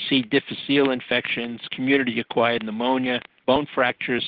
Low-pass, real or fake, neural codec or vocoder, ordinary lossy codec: 5.4 kHz; real; none; Opus, 16 kbps